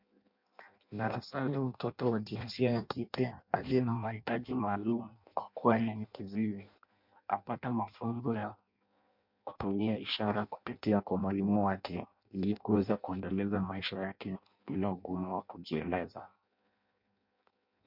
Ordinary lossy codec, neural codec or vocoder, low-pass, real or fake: MP3, 48 kbps; codec, 16 kHz in and 24 kHz out, 0.6 kbps, FireRedTTS-2 codec; 5.4 kHz; fake